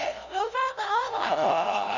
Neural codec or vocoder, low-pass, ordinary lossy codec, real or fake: codec, 16 kHz, 0.5 kbps, FunCodec, trained on LibriTTS, 25 frames a second; 7.2 kHz; none; fake